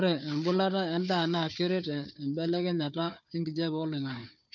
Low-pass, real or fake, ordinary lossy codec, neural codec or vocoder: 7.2 kHz; fake; none; codec, 16 kHz, 4 kbps, FunCodec, trained on Chinese and English, 50 frames a second